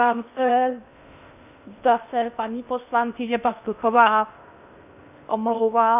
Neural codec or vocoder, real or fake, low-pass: codec, 16 kHz in and 24 kHz out, 0.6 kbps, FocalCodec, streaming, 2048 codes; fake; 3.6 kHz